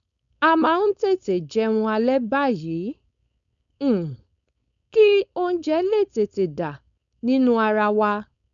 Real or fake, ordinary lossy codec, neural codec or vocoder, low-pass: fake; none; codec, 16 kHz, 4.8 kbps, FACodec; 7.2 kHz